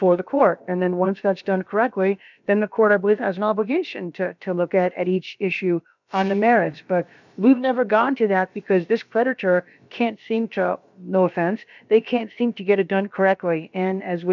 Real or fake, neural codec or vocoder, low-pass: fake; codec, 16 kHz, about 1 kbps, DyCAST, with the encoder's durations; 7.2 kHz